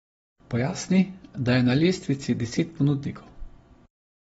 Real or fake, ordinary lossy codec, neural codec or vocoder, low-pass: real; AAC, 24 kbps; none; 19.8 kHz